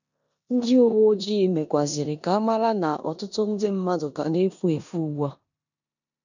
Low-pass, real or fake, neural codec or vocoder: 7.2 kHz; fake; codec, 16 kHz in and 24 kHz out, 0.9 kbps, LongCat-Audio-Codec, four codebook decoder